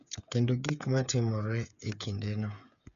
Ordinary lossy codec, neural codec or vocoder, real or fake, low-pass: none; codec, 16 kHz, 8 kbps, FreqCodec, smaller model; fake; 7.2 kHz